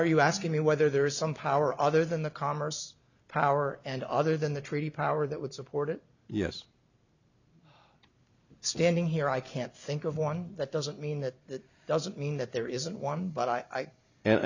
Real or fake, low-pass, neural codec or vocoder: real; 7.2 kHz; none